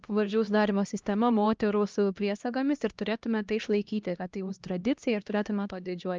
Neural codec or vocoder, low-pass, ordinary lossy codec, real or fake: codec, 16 kHz, 1 kbps, X-Codec, HuBERT features, trained on LibriSpeech; 7.2 kHz; Opus, 24 kbps; fake